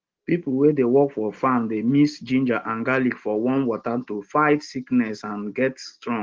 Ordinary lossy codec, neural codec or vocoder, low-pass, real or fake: Opus, 16 kbps; none; 7.2 kHz; real